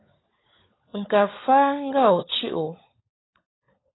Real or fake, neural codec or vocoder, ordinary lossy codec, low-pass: fake; codec, 16 kHz, 16 kbps, FunCodec, trained on LibriTTS, 50 frames a second; AAC, 16 kbps; 7.2 kHz